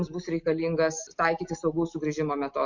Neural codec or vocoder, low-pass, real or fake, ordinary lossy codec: none; 7.2 kHz; real; MP3, 48 kbps